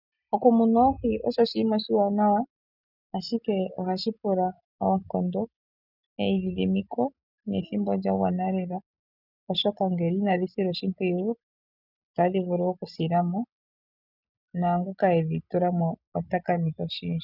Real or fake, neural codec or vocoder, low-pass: real; none; 5.4 kHz